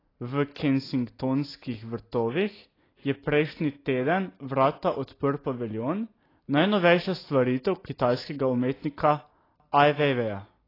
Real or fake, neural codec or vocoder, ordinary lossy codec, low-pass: real; none; AAC, 24 kbps; 5.4 kHz